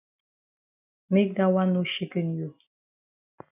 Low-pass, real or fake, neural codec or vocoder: 3.6 kHz; real; none